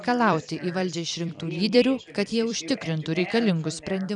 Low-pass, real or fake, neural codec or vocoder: 10.8 kHz; fake; vocoder, 44.1 kHz, 128 mel bands every 256 samples, BigVGAN v2